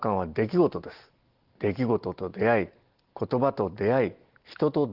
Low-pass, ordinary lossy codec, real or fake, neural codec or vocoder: 5.4 kHz; Opus, 16 kbps; real; none